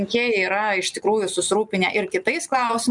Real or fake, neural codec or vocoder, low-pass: real; none; 10.8 kHz